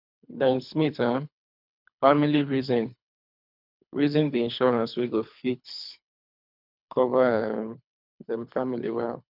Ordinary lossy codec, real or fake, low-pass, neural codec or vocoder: none; fake; 5.4 kHz; codec, 24 kHz, 3 kbps, HILCodec